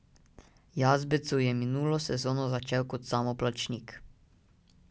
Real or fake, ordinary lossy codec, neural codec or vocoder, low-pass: real; none; none; none